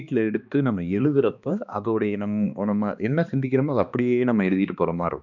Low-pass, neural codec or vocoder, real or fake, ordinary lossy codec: 7.2 kHz; codec, 16 kHz, 2 kbps, X-Codec, HuBERT features, trained on balanced general audio; fake; none